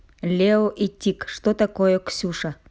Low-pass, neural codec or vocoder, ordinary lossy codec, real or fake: none; none; none; real